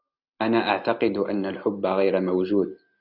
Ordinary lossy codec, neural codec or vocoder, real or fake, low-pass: AAC, 48 kbps; none; real; 5.4 kHz